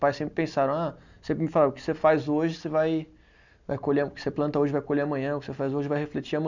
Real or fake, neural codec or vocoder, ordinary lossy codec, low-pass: real; none; none; 7.2 kHz